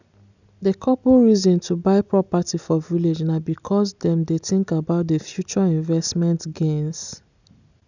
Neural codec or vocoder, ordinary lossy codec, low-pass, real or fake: none; none; 7.2 kHz; real